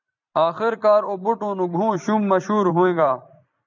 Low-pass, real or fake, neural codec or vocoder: 7.2 kHz; fake; vocoder, 44.1 kHz, 128 mel bands every 256 samples, BigVGAN v2